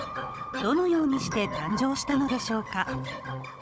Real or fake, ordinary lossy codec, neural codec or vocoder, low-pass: fake; none; codec, 16 kHz, 16 kbps, FunCodec, trained on Chinese and English, 50 frames a second; none